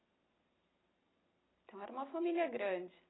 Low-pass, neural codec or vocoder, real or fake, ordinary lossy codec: 7.2 kHz; none; real; AAC, 16 kbps